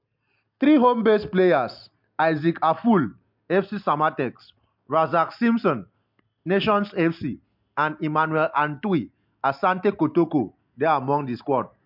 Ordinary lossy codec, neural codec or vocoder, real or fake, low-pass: MP3, 48 kbps; none; real; 5.4 kHz